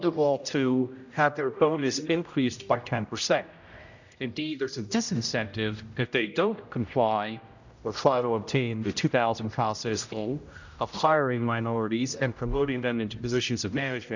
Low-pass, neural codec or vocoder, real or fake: 7.2 kHz; codec, 16 kHz, 0.5 kbps, X-Codec, HuBERT features, trained on general audio; fake